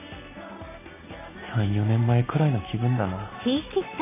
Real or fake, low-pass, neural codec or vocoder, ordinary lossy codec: real; 3.6 kHz; none; AAC, 24 kbps